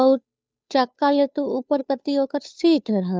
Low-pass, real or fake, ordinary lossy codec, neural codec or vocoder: none; fake; none; codec, 16 kHz, 2 kbps, FunCodec, trained on Chinese and English, 25 frames a second